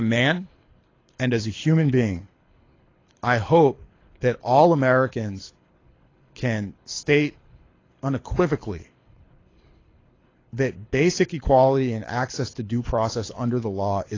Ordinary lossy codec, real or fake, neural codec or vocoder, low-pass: AAC, 32 kbps; fake; codec, 24 kHz, 6 kbps, HILCodec; 7.2 kHz